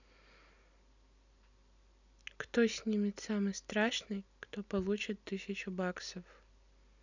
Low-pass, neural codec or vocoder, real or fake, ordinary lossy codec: 7.2 kHz; none; real; none